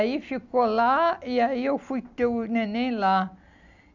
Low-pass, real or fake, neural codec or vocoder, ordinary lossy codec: 7.2 kHz; real; none; none